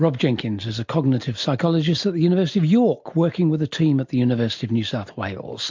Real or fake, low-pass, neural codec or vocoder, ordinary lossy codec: real; 7.2 kHz; none; MP3, 48 kbps